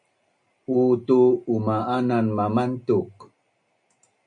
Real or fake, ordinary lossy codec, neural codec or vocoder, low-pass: real; MP3, 48 kbps; none; 9.9 kHz